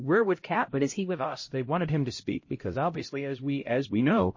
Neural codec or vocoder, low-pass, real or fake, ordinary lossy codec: codec, 16 kHz, 0.5 kbps, X-Codec, HuBERT features, trained on LibriSpeech; 7.2 kHz; fake; MP3, 32 kbps